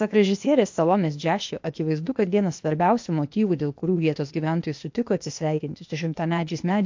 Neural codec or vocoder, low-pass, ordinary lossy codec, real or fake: codec, 16 kHz, 0.8 kbps, ZipCodec; 7.2 kHz; MP3, 48 kbps; fake